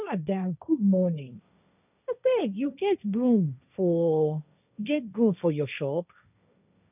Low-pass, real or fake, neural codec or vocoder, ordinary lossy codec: 3.6 kHz; fake; codec, 16 kHz, 1.1 kbps, Voila-Tokenizer; none